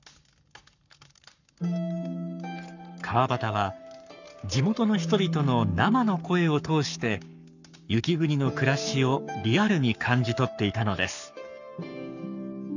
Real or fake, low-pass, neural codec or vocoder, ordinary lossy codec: fake; 7.2 kHz; codec, 44.1 kHz, 7.8 kbps, Pupu-Codec; none